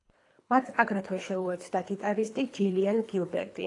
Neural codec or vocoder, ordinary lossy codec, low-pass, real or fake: codec, 24 kHz, 3 kbps, HILCodec; AAC, 48 kbps; 10.8 kHz; fake